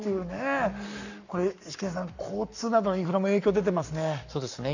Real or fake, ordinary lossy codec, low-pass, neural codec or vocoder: fake; none; 7.2 kHz; codec, 44.1 kHz, 7.8 kbps, Pupu-Codec